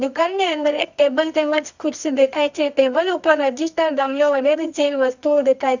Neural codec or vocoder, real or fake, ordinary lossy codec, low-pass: codec, 24 kHz, 0.9 kbps, WavTokenizer, medium music audio release; fake; none; 7.2 kHz